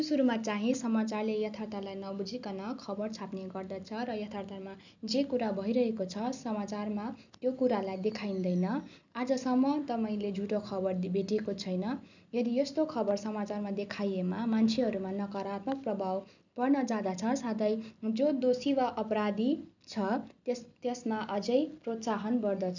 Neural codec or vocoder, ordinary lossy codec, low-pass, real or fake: none; none; 7.2 kHz; real